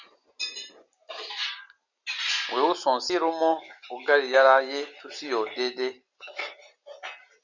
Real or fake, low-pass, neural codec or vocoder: real; 7.2 kHz; none